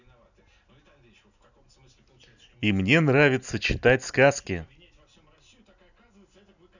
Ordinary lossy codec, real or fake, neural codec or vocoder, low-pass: none; real; none; 7.2 kHz